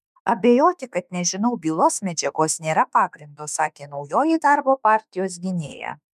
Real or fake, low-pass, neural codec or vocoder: fake; 14.4 kHz; autoencoder, 48 kHz, 32 numbers a frame, DAC-VAE, trained on Japanese speech